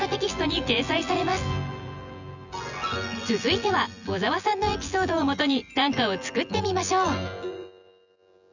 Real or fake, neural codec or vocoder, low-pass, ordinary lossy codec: fake; vocoder, 24 kHz, 100 mel bands, Vocos; 7.2 kHz; none